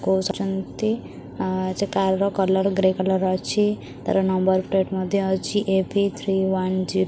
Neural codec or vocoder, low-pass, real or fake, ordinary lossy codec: none; none; real; none